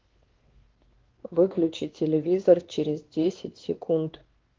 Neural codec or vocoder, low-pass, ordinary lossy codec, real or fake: codec, 16 kHz, 2 kbps, X-Codec, WavLM features, trained on Multilingual LibriSpeech; 7.2 kHz; Opus, 16 kbps; fake